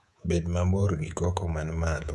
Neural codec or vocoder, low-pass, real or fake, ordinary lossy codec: codec, 24 kHz, 3.1 kbps, DualCodec; none; fake; none